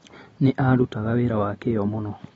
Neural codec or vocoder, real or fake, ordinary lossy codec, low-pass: none; real; AAC, 24 kbps; 10.8 kHz